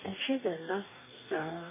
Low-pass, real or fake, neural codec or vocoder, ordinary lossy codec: 3.6 kHz; fake; codec, 44.1 kHz, 2.6 kbps, DAC; MP3, 16 kbps